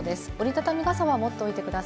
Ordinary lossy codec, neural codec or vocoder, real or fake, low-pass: none; none; real; none